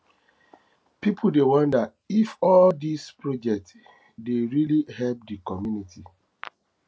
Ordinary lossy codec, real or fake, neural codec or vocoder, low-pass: none; real; none; none